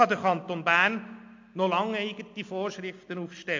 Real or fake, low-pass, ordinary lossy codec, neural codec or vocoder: real; 7.2 kHz; MP3, 48 kbps; none